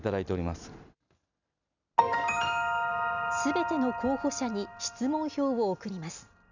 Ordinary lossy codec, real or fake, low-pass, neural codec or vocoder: none; real; 7.2 kHz; none